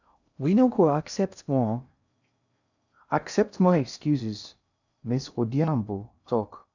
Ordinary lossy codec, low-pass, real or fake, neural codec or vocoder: none; 7.2 kHz; fake; codec, 16 kHz in and 24 kHz out, 0.6 kbps, FocalCodec, streaming, 2048 codes